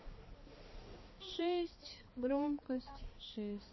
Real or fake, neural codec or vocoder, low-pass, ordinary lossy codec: fake; codec, 16 kHz, 2 kbps, X-Codec, HuBERT features, trained on balanced general audio; 7.2 kHz; MP3, 24 kbps